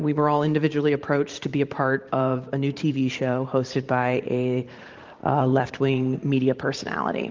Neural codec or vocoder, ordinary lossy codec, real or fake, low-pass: vocoder, 44.1 kHz, 128 mel bands every 512 samples, BigVGAN v2; Opus, 32 kbps; fake; 7.2 kHz